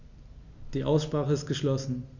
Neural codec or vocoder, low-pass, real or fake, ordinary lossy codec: none; 7.2 kHz; real; Opus, 64 kbps